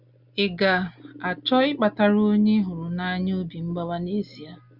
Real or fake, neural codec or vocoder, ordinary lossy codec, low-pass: real; none; Opus, 64 kbps; 5.4 kHz